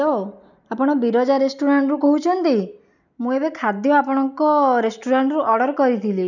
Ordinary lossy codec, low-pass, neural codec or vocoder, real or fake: none; 7.2 kHz; none; real